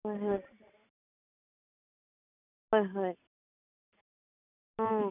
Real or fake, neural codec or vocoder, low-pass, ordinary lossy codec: real; none; 3.6 kHz; none